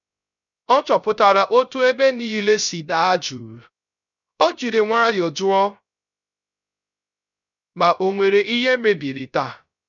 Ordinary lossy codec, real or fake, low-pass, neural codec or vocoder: none; fake; 7.2 kHz; codec, 16 kHz, 0.3 kbps, FocalCodec